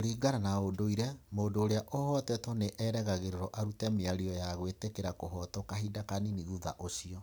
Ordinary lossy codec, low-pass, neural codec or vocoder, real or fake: none; none; none; real